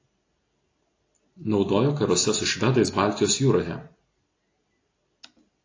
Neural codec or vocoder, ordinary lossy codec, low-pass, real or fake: none; AAC, 32 kbps; 7.2 kHz; real